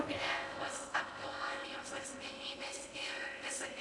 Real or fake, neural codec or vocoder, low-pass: fake; codec, 16 kHz in and 24 kHz out, 0.6 kbps, FocalCodec, streaming, 4096 codes; 10.8 kHz